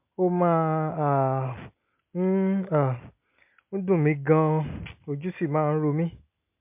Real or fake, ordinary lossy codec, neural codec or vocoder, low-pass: real; MP3, 32 kbps; none; 3.6 kHz